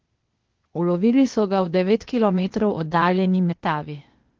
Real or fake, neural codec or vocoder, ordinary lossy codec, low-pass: fake; codec, 16 kHz, 0.8 kbps, ZipCodec; Opus, 16 kbps; 7.2 kHz